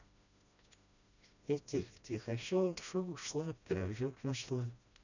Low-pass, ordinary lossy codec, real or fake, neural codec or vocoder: 7.2 kHz; none; fake; codec, 16 kHz, 1 kbps, FreqCodec, smaller model